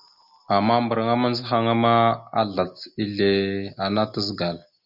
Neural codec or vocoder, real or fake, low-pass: none; real; 5.4 kHz